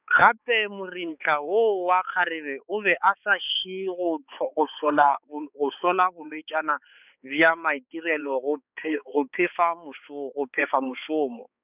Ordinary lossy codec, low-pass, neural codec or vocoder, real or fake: none; 3.6 kHz; codec, 16 kHz, 4 kbps, X-Codec, HuBERT features, trained on balanced general audio; fake